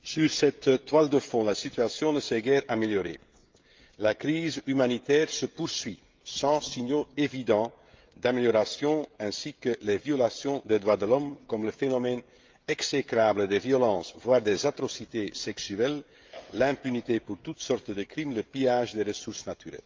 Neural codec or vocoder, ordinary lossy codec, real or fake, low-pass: codec, 16 kHz, 16 kbps, FreqCodec, smaller model; Opus, 24 kbps; fake; 7.2 kHz